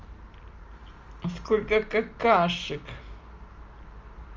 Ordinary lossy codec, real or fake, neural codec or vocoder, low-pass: Opus, 32 kbps; real; none; 7.2 kHz